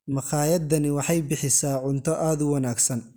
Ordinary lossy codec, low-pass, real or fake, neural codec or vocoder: none; none; real; none